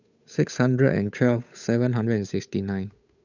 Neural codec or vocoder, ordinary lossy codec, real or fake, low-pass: codec, 16 kHz, 8 kbps, FunCodec, trained on Chinese and English, 25 frames a second; none; fake; 7.2 kHz